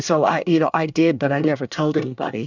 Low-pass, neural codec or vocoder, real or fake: 7.2 kHz; codec, 24 kHz, 1 kbps, SNAC; fake